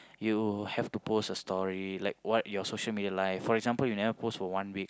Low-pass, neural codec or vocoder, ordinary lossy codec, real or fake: none; none; none; real